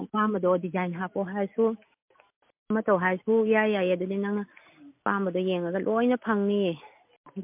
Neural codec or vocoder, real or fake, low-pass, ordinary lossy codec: none; real; 3.6 kHz; MP3, 32 kbps